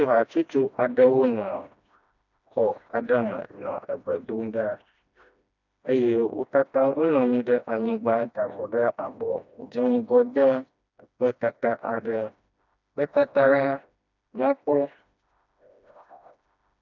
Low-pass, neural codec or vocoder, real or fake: 7.2 kHz; codec, 16 kHz, 1 kbps, FreqCodec, smaller model; fake